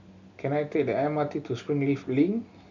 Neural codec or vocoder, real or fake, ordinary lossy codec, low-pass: none; real; none; 7.2 kHz